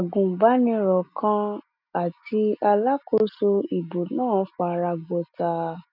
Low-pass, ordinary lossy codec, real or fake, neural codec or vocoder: 5.4 kHz; none; real; none